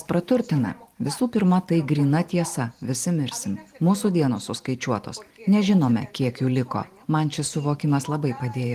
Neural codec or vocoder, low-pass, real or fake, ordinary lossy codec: vocoder, 44.1 kHz, 128 mel bands every 512 samples, BigVGAN v2; 14.4 kHz; fake; Opus, 24 kbps